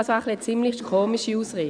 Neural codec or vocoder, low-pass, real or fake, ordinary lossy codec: none; 9.9 kHz; real; MP3, 96 kbps